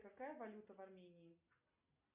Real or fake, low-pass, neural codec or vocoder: real; 3.6 kHz; none